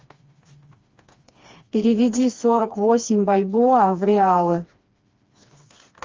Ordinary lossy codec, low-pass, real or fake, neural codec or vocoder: Opus, 32 kbps; 7.2 kHz; fake; codec, 16 kHz, 2 kbps, FreqCodec, smaller model